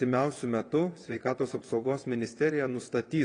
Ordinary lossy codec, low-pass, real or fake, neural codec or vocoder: AAC, 32 kbps; 9.9 kHz; fake; vocoder, 22.05 kHz, 80 mel bands, WaveNeXt